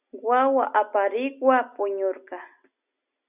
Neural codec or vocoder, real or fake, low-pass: none; real; 3.6 kHz